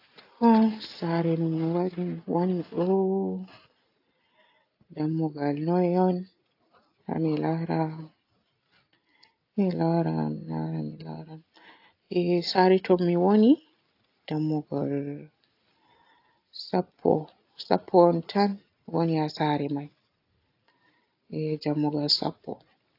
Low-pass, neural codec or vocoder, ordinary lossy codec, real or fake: 5.4 kHz; none; AAC, 32 kbps; real